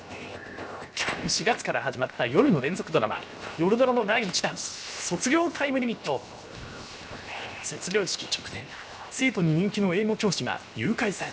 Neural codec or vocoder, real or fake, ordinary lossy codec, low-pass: codec, 16 kHz, 0.7 kbps, FocalCodec; fake; none; none